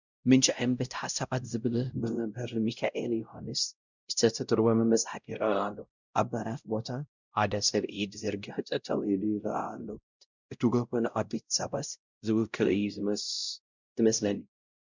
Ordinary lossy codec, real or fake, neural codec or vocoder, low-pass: Opus, 64 kbps; fake; codec, 16 kHz, 0.5 kbps, X-Codec, WavLM features, trained on Multilingual LibriSpeech; 7.2 kHz